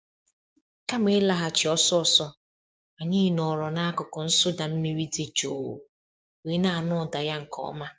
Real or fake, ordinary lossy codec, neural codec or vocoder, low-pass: fake; none; codec, 16 kHz, 6 kbps, DAC; none